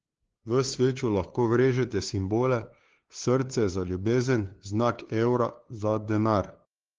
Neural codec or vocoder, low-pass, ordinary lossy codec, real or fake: codec, 16 kHz, 2 kbps, FunCodec, trained on LibriTTS, 25 frames a second; 7.2 kHz; Opus, 16 kbps; fake